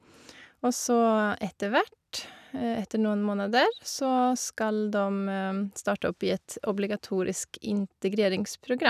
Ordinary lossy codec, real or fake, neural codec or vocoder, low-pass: none; real; none; 14.4 kHz